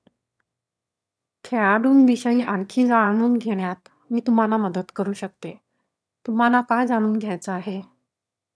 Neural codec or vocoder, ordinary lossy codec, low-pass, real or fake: autoencoder, 22.05 kHz, a latent of 192 numbers a frame, VITS, trained on one speaker; none; none; fake